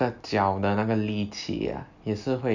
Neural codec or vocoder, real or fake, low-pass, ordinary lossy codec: none; real; 7.2 kHz; none